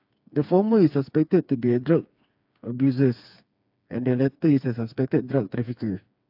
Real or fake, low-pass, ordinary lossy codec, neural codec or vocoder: fake; 5.4 kHz; AAC, 48 kbps; codec, 16 kHz, 4 kbps, FreqCodec, smaller model